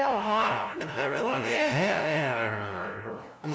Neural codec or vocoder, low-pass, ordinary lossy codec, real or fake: codec, 16 kHz, 0.5 kbps, FunCodec, trained on LibriTTS, 25 frames a second; none; none; fake